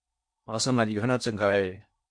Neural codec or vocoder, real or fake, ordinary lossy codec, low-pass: codec, 16 kHz in and 24 kHz out, 0.6 kbps, FocalCodec, streaming, 4096 codes; fake; MP3, 64 kbps; 9.9 kHz